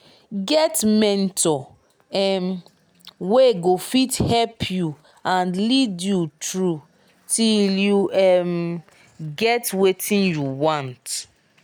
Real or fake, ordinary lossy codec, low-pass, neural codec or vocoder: real; none; none; none